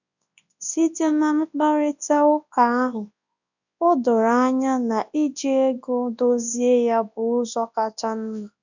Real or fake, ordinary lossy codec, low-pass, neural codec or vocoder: fake; none; 7.2 kHz; codec, 24 kHz, 0.9 kbps, WavTokenizer, large speech release